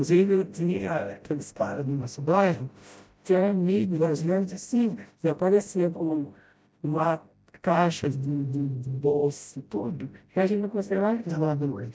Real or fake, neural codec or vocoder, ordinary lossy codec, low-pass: fake; codec, 16 kHz, 0.5 kbps, FreqCodec, smaller model; none; none